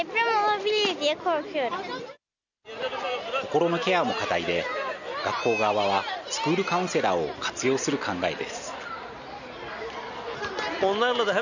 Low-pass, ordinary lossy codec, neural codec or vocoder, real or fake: 7.2 kHz; none; none; real